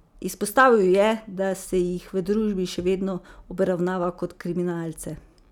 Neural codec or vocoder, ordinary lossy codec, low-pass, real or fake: none; none; 19.8 kHz; real